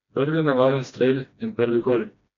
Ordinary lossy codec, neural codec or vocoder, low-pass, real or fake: MP3, 64 kbps; codec, 16 kHz, 1 kbps, FreqCodec, smaller model; 7.2 kHz; fake